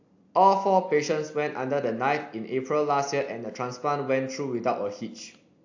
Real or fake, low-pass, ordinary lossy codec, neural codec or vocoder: real; 7.2 kHz; AAC, 48 kbps; none